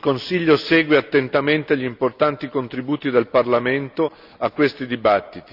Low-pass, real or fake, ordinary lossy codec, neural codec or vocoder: 5.4 kHz; real; none; none